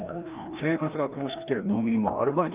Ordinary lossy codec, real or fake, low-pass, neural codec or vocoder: Opus, 32 kbps; fake; 3.6 kHz; codec, 16 kHz, 1 kbps, FreqCodec, larger model